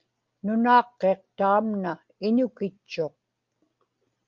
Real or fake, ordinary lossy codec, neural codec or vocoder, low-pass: real; Opus, 24 kbps; none; 7.2 kHz